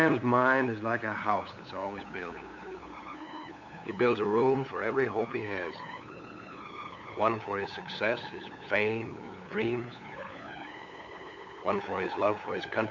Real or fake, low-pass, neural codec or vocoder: fake; 7.2 kHz; codec, 16 kHz, 8 kbps, FunCodec, trained on LibriTTS, 25 frames a second